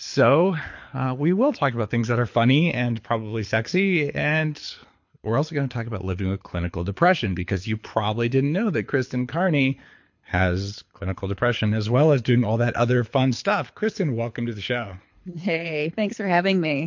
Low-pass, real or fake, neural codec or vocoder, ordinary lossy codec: 7.2 kHz; fake; codec, 24 kHz, 6 kbps, HILCodec; MP3, 48 kbps